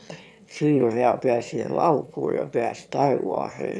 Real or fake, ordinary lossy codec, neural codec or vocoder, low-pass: fake; none; autoencoder, 22.05 kHz, a latent of 192 numbers a frame, VITS, trained on one speaker; none